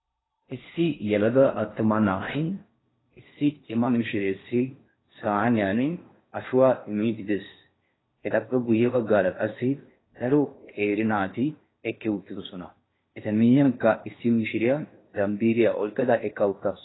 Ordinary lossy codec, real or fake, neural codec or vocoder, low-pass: AAC, 16 kbps; fake; codec, 16 kHz in and 24 kHz out, 0.6 kbps, FocalCodec, streaming, 4096 codes; 7.2 kHz